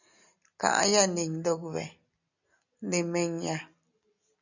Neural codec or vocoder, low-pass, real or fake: none; 7.2 kHz; real